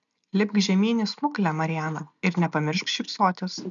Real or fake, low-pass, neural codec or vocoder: real; 7.2 kHz; none